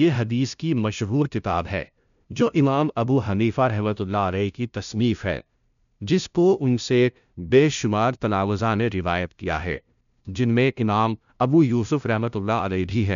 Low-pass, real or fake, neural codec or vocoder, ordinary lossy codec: 7.2 kHz; fake; codec, 16 kHz, 0.5 kbps, FunCodec, trained on LibriTTS, 25 frames a second; none